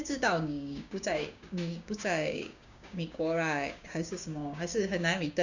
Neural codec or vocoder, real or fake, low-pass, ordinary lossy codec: codec, 16 kHz, 6 kbps, DAC; fake; 7.2 kHz; none